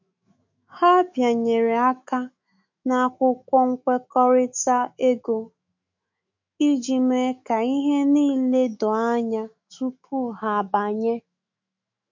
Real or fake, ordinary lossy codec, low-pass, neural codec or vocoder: fake; MP3, 48 kbps; 7.2 kHz; autoencoder, 48 kHz, 128 numbers a frame, DAC-VAE, trained on Japanese speech